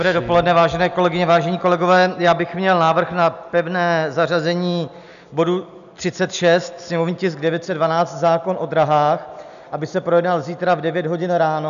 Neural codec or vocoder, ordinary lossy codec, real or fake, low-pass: none; MP3, 96 kbps; real; 7.2 kHz